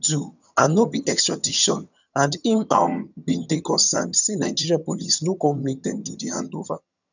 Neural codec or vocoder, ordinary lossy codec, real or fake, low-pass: vocoder, 22.05 kHz, 80 mel bands, HiFi-GAN; none; fake; 7.2 kHz